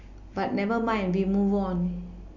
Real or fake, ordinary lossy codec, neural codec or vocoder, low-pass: real; none; none; 7.2 kHz